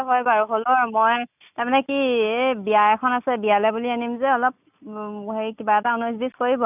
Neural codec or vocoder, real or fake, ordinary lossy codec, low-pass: none; real; none; 3.6 kHz